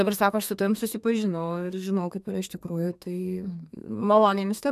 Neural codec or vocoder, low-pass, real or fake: codec, 32 kHz, 1.9 kbps, SNAC; 14.4 kHz; fake